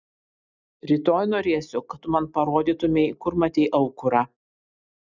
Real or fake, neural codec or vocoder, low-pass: real; none; 7.2 kHz